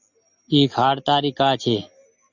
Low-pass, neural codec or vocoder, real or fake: 7.2 kHz; none; real